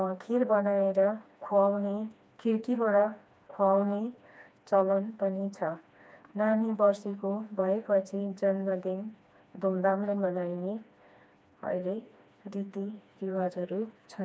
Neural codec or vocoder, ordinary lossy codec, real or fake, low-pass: codec, 16 kHz, 2 kbps, FreqCodec, smaller model; none; fake; none